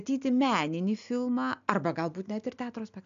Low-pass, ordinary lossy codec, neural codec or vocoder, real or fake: 7.2 kHz; AAC, 64 kbps; none; real